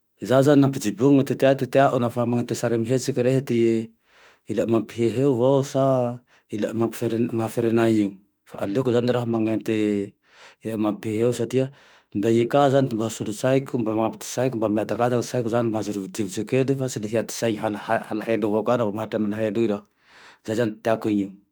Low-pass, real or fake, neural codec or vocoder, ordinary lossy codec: none; fake; autoencoder, 48 kHz, 32 numbers a frame, DAC-VAE, trained on Japanese speech; none